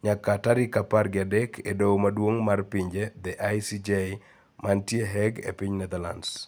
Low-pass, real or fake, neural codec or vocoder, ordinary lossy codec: none; real; none; none